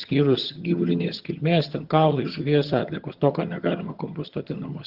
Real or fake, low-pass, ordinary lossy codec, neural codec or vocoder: fake; 5.4 kHz; Opus, 16 kbps; vocoder, 22.05 kHz, 80 mel bands, HiFi-GAN